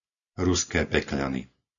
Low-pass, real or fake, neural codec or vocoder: 7.2 kHz; real; none